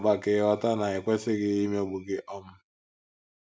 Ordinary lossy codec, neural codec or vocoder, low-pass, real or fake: none; none; none; real